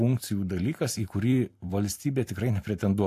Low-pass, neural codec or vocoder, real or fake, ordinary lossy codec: 14.4 kHz; vocoder, 44.1 kHz, 128 mel bands every 256 samples, BigVGAN v2; fake; AAC, 64 kbps